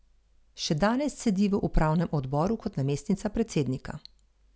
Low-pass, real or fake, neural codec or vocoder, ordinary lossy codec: none; real; none; none